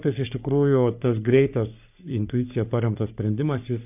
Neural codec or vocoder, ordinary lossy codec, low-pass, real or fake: codec, 44.1 kHz, 3.4 kbps, Pupu-Codec; AAC, 32 kbps; 3.6 kHz; fake